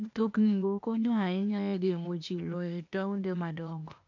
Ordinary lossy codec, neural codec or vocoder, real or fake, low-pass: none; codec, 16 kHz, 0.8 kbps, ZipCodec; fake; 7.2 kHz